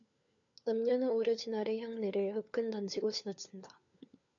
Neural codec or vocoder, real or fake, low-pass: codec, 16 kHz, 4 kbps, FunCodec, trained on LibriTTS, 50 frames a second; fake; 7.2 kHz